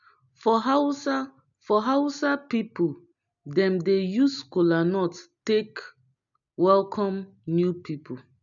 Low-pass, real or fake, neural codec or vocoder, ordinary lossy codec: 7.2 kHz; real; none; none